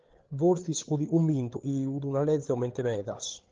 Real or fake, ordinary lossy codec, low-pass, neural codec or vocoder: fake; Opus, 16 kbps; 7.2 kHz; codec, 16 kHz, 8 kbps, FreqCodec, larger model